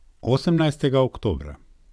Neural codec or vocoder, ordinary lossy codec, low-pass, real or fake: vocoder, 22.05 kHz, 80 mel bands, WaveNeXt; none; none; fake